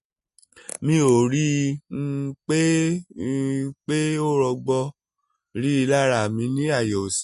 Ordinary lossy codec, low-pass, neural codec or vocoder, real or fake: MP3, 48 kbps; 14.4 kHz; none; real